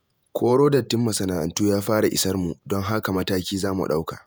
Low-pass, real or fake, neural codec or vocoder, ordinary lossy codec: none; fake; vocoder, 48 kHz, 128 mel bands, Vocos; none